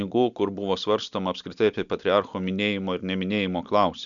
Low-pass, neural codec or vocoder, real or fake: 7.2 kHz; none; real